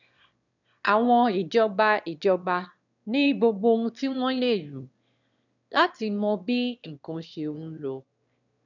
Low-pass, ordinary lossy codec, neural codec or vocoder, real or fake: 7.2 kHz; none; autoencoder, 22.05 kHz, a latent of 192 numbers a frame, VITS, trained on one speaker; fake